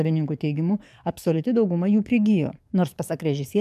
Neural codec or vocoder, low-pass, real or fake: codec, 44.1 kHz, 7.8 kbps, DAC; 14.4 kHz; fake